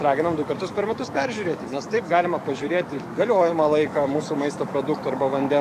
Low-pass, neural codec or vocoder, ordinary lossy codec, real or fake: 14.4 kHz; codec, 44.1 kHz, 7.8 kbps, DAC; AAC, 96 kbps; fake